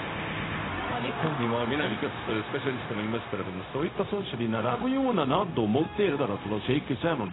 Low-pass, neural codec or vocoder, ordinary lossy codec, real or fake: 7.2 kHz; codec, 16 kHz, 0.4 kbps, LongCat-Audio-Codec; AAC, 16 kbps; fake